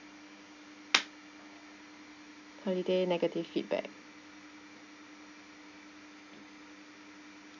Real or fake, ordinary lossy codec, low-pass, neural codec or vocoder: real; none; 7.2 kHz; none